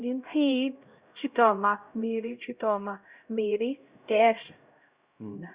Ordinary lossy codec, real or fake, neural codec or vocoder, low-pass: Opus, 64 kbps; fake; codec, 16 kHz, 0.5 kbps, X-Codec, HuBERT features, trained on LibriSpeech; 3.6 kHz